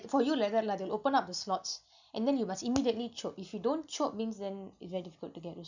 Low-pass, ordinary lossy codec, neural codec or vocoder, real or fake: 7.2 kHz; none; none; real